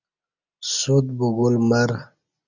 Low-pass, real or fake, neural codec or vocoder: 7.2 kHz; real; none